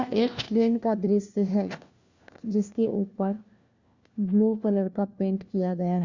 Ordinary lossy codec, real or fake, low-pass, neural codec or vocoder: Opus, 64 kbps; fake; 7.2 kHz; codec, 16 kHz, 1 kbps, FunCodec, trained on LibriTTS, 50 frames a second